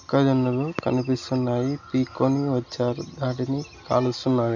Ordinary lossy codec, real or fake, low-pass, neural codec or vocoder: none; real; 7.2 kHz; none